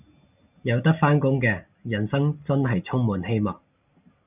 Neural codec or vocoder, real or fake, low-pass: none; real; 3.6 kHz